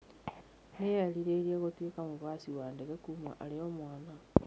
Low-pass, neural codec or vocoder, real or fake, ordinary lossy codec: none; none; real; none